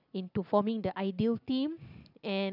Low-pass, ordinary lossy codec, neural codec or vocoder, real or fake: 5.4 kHz; none; none; real